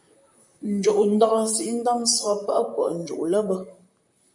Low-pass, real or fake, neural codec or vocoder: 10.8 kHz; fake; vocoder, 44.1 kHz, 128 mel bands, Pupu-Vocoder